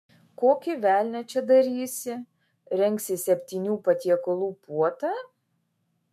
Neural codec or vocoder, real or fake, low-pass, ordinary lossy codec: autoencoder, 48 kHz, 128 numbers a frame, DAC-VAE, trained on Japanese speech; fake; 14.4 kHz; MP3, 64 kbps